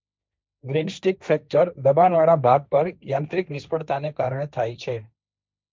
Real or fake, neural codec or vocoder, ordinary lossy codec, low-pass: fake; codec, 16 kHz, 1.1 kbps, Voila-Tokenizer; none; none